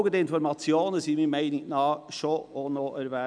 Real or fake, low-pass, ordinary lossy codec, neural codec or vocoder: real; 9.9 kHz; none; none